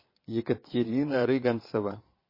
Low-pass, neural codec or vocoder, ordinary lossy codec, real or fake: 5.4 kHz; vocoder, 22.05 kHz, 80 mel bands, Vocos; MP3, 24 kbps; fake